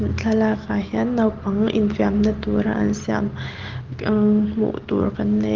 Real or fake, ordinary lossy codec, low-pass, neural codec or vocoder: real; Opus, 16 kbps; 7.2 kHz; none